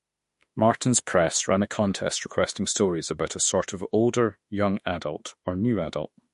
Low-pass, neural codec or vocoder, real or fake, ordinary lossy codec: 14.4 kHz; autoencoder, 48 kHz, 32 numbers a frame, DAC-VAE, trained on Japanese speech; fake; MP3, 48 kbps